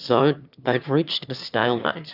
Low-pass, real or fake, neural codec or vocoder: 5.4 kHz; fake; autoencoder, 22.05 kHz, a latent of 192 numbers a frame, VITS, trained on one speaker